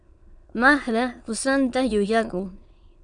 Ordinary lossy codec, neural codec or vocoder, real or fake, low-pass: Opus, 64 kbps; autoencoder, 22.05 kHz, a latent of 192 numbers a frame, VITS, trained on many speakers; fake; 9.9 kHz